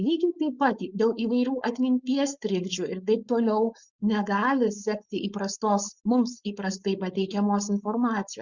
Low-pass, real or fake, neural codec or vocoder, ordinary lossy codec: 7.2 kHz; fake; codec, 16 kHz, 4.8 kbps, FACodec; Opus, 64 kbps